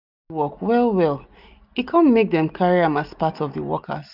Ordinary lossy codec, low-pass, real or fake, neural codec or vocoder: none; 5.4 kHz; real; none